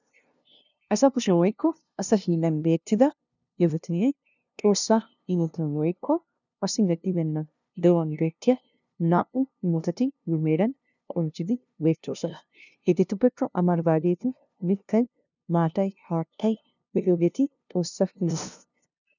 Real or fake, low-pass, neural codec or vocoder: fake; 7.2 kHz; codec, 16 kHz, 0.5 kbps, FunCodec, trained on LibriTTS, 25 frames a second